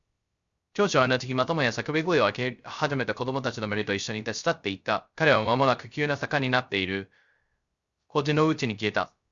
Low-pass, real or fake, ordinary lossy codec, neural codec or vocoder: 7.2 kHz; fake; Opus, 64 kbps; codec, 16 kHz, 0.3 kbps, FocalCodec